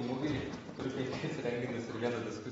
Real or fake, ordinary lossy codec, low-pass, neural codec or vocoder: real; AAC, 24 kbps; 19.8 kHz; none